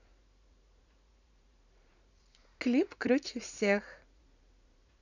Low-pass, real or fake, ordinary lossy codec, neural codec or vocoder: 7.2 kHz; fake; none; vocoder, 44.1 kHz, 80 mel bands, Vocos